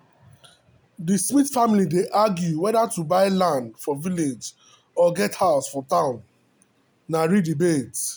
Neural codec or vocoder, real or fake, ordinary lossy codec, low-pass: none; real; none; none